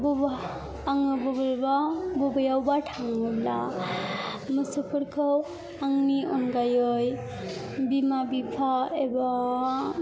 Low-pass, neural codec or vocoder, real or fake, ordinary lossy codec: none; none; real; none